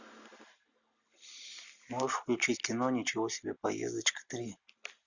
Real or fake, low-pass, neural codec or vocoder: real; 7.2 kHz; none